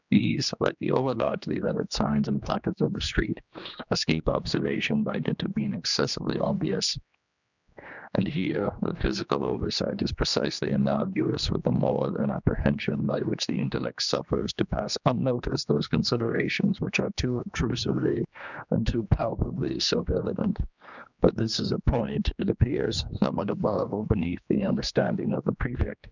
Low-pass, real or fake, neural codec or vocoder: 7.2 kHz; fake; codec, 16 kHz, 2 kbps, X-Codec, HuBERT features, trained on general audio